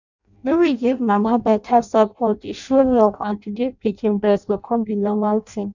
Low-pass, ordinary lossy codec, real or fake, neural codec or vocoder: 7.2 kHz; none; fake; codec, 16 kHz in and 24 kHz out, 0.6 kbps, FireRedTTS-2 codec